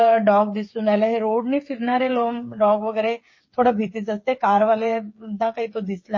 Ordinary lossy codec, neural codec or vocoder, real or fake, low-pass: MP3, 32 kbps; codec, 16 kHz, 8 kbps, FreqCodec, smaller model; fake; 7.2 kHz